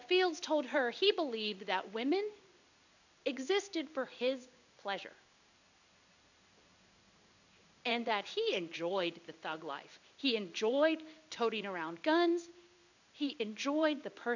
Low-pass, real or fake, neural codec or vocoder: 7.2 kHz; fake; codec, 16 kHz in and 24 kHz out, 1 kbps, XY-Tokenizer